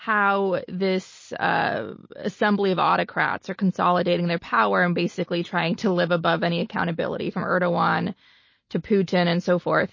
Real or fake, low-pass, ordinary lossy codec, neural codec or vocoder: real; 7.2 kHz; MP3, 32 kbps; none